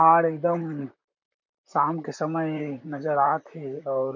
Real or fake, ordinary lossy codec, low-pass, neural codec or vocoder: fake; none; 7.2 kHz; vocoder, 44.1 kHz, 128 mel bands, Pupu-Vocoder